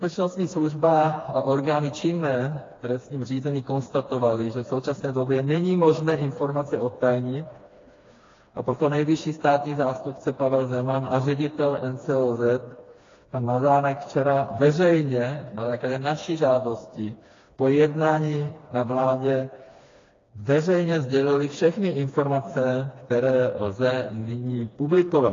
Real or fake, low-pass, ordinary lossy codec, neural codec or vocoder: fake; 7.2 kHz; AAC, 32 kbps; codec, 16 kHz, 2 kbps, FreqCodec, smaller model